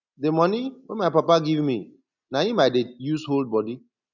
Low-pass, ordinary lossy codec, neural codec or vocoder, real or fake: 7.2 kHz; none; none; real